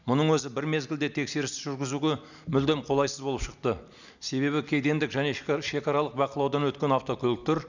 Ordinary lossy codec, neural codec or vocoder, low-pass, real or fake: none; none; 7.2 kHz; real